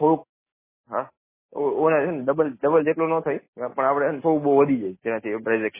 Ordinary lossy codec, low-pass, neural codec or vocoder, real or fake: MP3, 16 kbps; 3.6 kHz; none; real